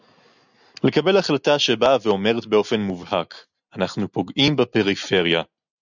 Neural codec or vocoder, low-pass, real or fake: none; 7.2 kHz; real